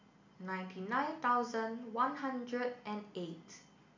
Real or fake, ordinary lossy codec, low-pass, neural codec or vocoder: real; MP3, 64 kbps; 7.2 kHz; none